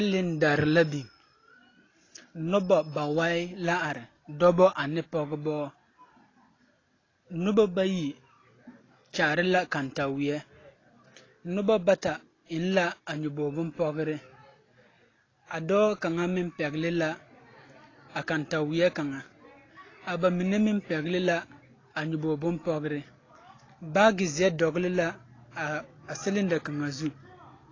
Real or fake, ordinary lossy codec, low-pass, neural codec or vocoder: real; AAC, 32 kbps; 7.2 kHz; none